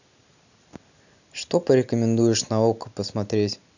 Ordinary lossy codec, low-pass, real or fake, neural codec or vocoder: none; 7.2 kHz; real; none